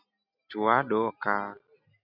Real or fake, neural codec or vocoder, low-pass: real; none; 5.4 kHz